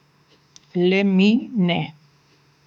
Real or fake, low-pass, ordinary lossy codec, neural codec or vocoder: fake; 19.8 kHz; none; autoencoder, 48 kHz, 32 numbers a frame, DAC-VAE, trained on Japanese speech